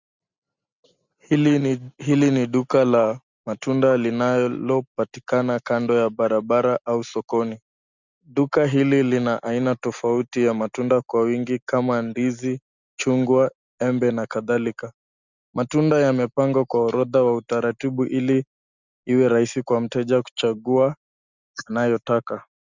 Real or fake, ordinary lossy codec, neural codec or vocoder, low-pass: real; Opus, 64 kbps; none; 7.2 kHz